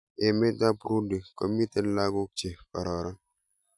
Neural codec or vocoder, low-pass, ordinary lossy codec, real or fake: none; 10.8 kHz; none; real